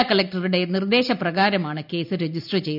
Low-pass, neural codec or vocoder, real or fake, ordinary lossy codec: 5.4 kHz; none; real; none